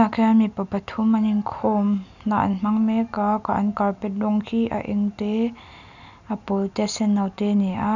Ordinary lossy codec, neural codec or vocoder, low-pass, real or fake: none; none; 7.2 kHz; real